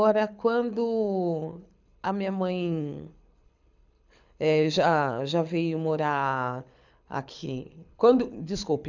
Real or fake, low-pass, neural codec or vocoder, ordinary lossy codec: fake; 7.2 kHz; codec, 24 kHz, 6 kbps, HILCodec; none